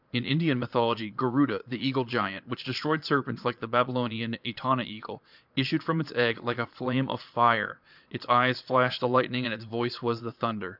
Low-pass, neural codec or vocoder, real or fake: 5.4 kHz; vocoder, 22.05 kHz, 80 mel bands, Vocos; fake